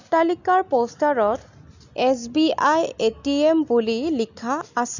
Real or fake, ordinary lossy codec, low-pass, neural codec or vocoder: real; none; 7.2 kHz; none